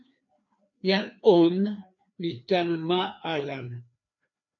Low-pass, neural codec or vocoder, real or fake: 7.2 kHz; codec, 16 kHz, 2 kbps, FreqCodec, larger model; fake